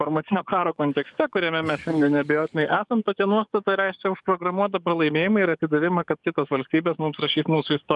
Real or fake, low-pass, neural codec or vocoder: fake; 10.8 kHz; codec, 44.1 kHz, 7.8 kbps, DAC